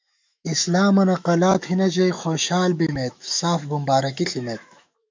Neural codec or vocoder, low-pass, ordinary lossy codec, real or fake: autoencoder, 48 kHz, 128 numbers a frame, DAC-VAE, trained on Japanese speech; 7.2 kHz; MP3, 64 kbps; fake